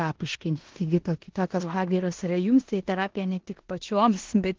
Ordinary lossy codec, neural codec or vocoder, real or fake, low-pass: Opus, 16 kbps; codec, 16 kHz in and 24 kHz out, 0.9 kbps, LongCat-Audio-Codec, four codebook decoder; fake; 7.2 kHz